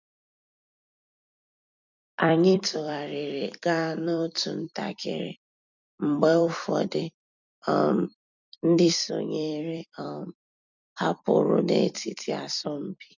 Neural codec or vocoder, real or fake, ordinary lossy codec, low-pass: vocoder, 44.1 kHz, 128 mel bands every 256 samples, BigVGAN v2; fake; none; 7.2 kHz